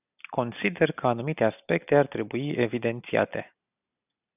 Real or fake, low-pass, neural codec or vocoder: real; 3.6 kHz; none